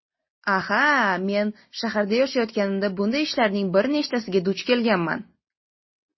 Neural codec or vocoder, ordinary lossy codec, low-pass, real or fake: none; MP3, 24 kbps; 7.2 kHz; real